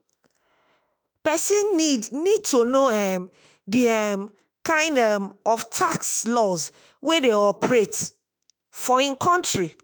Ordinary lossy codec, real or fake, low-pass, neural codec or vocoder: none; fake; none; autoencoder, 48 kHz, 32 numbers a frame, DAC-VAE, trained on Japanese speech